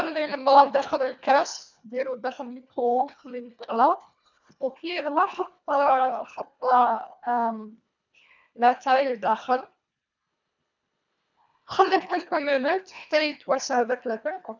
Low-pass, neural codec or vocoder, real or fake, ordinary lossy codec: 7.2 kHz; codec, 24 kHz, 1.5 kbps, HILCodec; fake; none